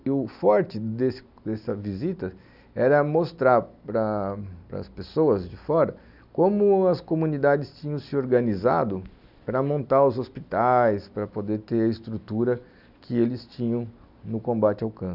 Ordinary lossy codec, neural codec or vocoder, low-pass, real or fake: none; none; 5.4 kHz; real